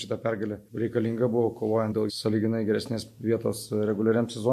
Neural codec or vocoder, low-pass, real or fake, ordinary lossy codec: none; 14.4 kHz; real; MP3, 64 kbps